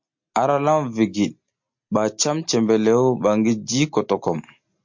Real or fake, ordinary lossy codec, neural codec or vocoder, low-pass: real; MP3, 48 kbps; none; 7.2 kHz